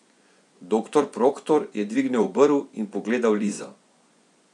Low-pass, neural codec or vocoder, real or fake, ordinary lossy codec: 10.8 kHz; vocoder, 24 kHz, 100 mel bands, Vocos; fake; none